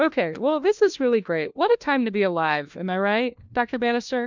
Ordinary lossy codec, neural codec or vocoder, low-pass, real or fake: MP3, 64 kbps; codec, 16 kHz, 1 kbps, FunCodec, trained on LibriTTS, 50 frames a second; 7.2 kHz; fake